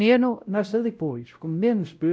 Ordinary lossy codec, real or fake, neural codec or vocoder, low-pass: none; fake; codec, 16 kHz, 0.5 kbps, X-Codec, WavLM features, trained on Multilingual LibriSpeech; none